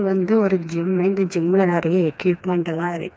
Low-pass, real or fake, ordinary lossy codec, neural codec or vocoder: none; fake; none; codec, 16 kHz, 2 kbps, FreqCodec, smaller model